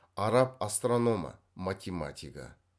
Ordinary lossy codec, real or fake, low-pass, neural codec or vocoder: none; real; none; none